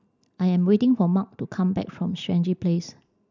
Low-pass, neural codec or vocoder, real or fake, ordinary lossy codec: 7.2 kHz; none; real; none